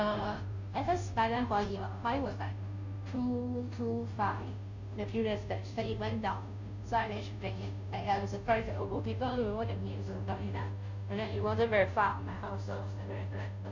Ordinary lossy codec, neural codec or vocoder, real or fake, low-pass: none; codec, 16 kHz, 0.5 kbps, FunCodec, trained on Chinese and English, 25 frames a second; fake; 7.2 kHz